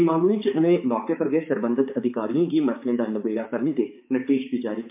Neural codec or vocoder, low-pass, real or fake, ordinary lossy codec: codec, 16 kHz, 4 kbps, X-Codec, HuBERT features, trained on balanced general audio; 3.6 kHz; fake; MP3, 32 kbps